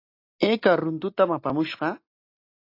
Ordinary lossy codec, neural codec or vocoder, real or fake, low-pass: AAC, 32 kbps; none; real; 5.4 kHz